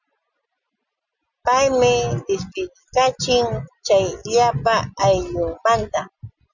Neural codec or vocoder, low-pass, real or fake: none; 7.2 kHz; real